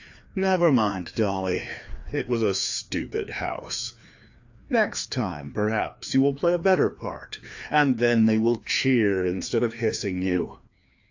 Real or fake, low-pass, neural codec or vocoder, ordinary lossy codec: fake; 7.2 kHz; codec, 16 kHz, 2 kbps, FreqCodec, larger model; AAC, 48 kbps